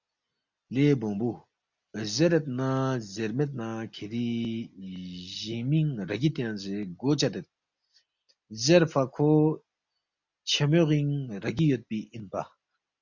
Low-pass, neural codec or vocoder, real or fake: 7.2 kHz; none; real